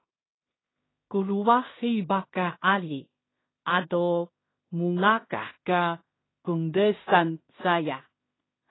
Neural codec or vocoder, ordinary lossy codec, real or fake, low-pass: codec, 16 kHz in and 24 kHz out, 0.4 kbps, LongCat-Audio-Codec, two codebook decoder; AAC, 16 kbps; fake; 7.2 kHz